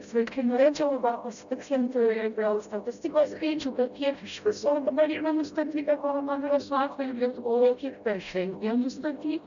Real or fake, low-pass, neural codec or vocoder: fake; 7.2 kHz; codec, 16 kHz, 0.5 kbps, FreqCodec, smaller model